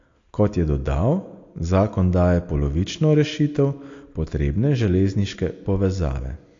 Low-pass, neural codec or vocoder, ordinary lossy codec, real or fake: 7.2 kHz; none; AAC, 48 kbps; real